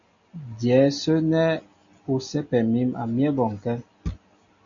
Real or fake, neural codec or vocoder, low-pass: real; none; 7.2 kHz